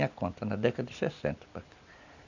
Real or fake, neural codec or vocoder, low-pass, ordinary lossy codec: real; none; 7.2 kHz; none